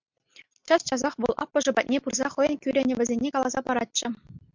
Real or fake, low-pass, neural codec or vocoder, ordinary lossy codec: real; 7.2 kHz; none; MP3, 64 kbps